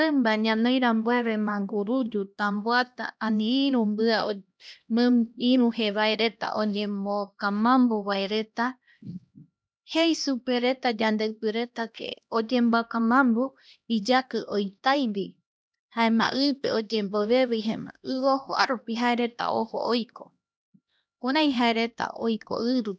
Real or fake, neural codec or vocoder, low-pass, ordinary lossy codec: fake; codec, 16 kHz, 1 kbps, X-Codec, HuBERT features, trained on LibriSpeech; none; none